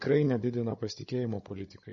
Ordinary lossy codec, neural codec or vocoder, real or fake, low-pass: MP3, 32 kbps; codec, 16 kHz, 16 kbps, FunCodec, trained on Chinese and English, 50 frames a second; fake; 7.2 kHz